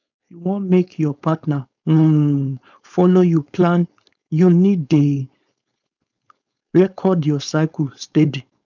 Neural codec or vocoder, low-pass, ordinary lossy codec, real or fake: codec, 16 kHz, 4.8 kbps, FACodec; 7.2 kHz; none; fake